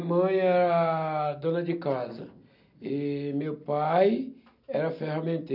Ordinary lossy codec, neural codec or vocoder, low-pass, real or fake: none; none; 5.4 kHz; real